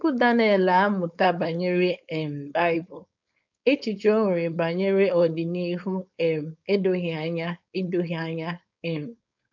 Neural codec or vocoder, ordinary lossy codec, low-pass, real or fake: codec, 16 kHz, 4.8 kbps, FACodec; none; 7.2 kHz; fake